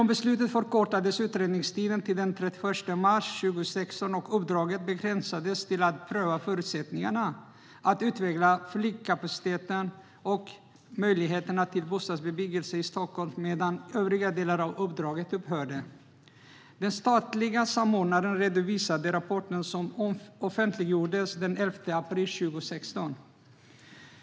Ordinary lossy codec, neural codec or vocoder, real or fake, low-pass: none; none; real; none